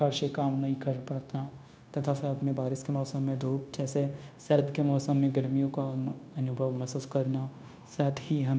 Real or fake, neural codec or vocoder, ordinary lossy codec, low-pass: fake; codec, 16 kHz, 0.9 kbps, LongCat-Audio-Codec; none; none